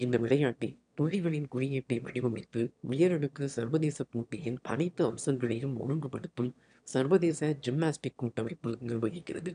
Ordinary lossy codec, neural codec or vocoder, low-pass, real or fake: none; autoencoder, 22.05 kHz, a latent of 192 numbers a frame, VITS, trained on one speaker; 9.9 kHz; fake